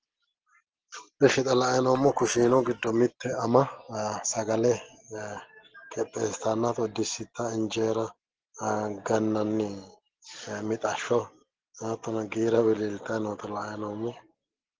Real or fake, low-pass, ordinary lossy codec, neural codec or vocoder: real; 7.2 kHz; Opus, 16 kbps; none